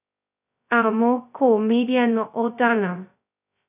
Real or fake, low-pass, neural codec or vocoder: fake; 3.6 kHz; codec, 16 kHz, 0.2 kbps, FocalCodec